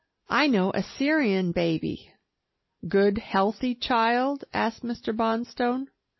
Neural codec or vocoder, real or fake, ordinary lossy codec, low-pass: none; real; MP3, 24 kbps; 7.2 kHz